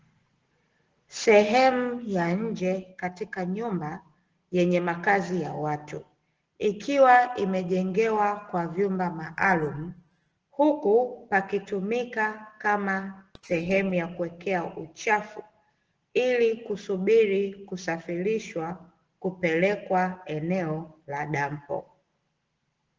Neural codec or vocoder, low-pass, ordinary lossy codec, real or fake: none; 7.2 kHz; Opus, 16 kbps; real